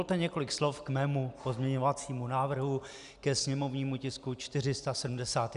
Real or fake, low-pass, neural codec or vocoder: real; 10.8 kHz; none